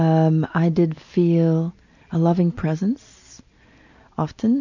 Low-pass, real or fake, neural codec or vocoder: 7.2 kHz; real; none